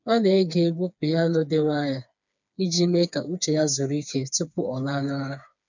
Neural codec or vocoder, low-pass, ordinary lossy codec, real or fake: codec, 16 kHz, 4 kbps, FreqCodec, smaller model; 7.2 kHz; none; fake